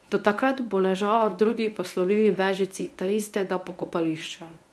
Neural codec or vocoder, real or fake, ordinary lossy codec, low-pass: codec, 24 kHz, 0.9 kbps, WavTokenizer, medium speech release version 1; fake; none; none